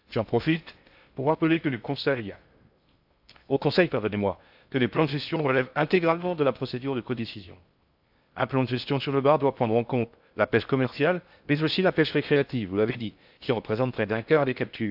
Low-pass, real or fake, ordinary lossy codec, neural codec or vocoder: 5.4 kHz; fake; AAC, 48 kbps; codec, 16 kHz in and 24 kHz out, 0.6 kbps, FocalCodec, streaming, 2048 codes